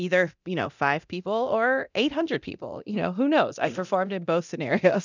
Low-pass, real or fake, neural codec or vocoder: 7.2 kHz; fake; codec, 24 kHz, 0.9 kbps, DualCodec